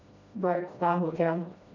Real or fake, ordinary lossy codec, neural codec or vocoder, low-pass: fake; none; codec, 16 kHz, 1 kbps, FreqCodec, smaller model; 7.2 kHz